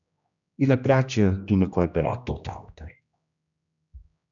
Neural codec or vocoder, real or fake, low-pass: codec, 16 kHz, 1 kbps, X-Codec, HuBERT features, trained on general audio; fake; 7.2 kHz